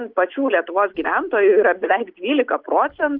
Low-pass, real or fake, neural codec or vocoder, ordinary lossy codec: 5.4 kHz; real; none; Opus, 24 kbps